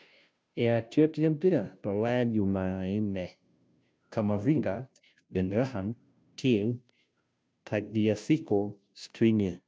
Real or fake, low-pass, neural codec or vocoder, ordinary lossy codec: fake; none; codec, 16 kHz, 0.5 kbps, FunCodec, trained on Chinese and English, 25 frames a second; none